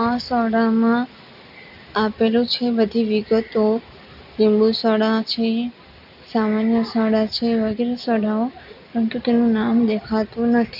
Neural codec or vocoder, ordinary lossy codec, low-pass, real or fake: none; none; 5.4 kHz; real